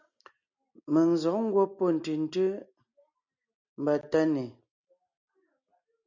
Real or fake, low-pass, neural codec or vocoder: real; 7.2 kHz; none